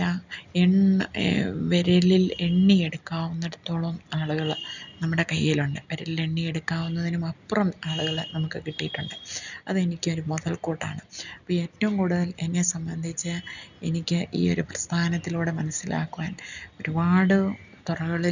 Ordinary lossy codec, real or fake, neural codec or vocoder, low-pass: none; real; none; 7.2 kHz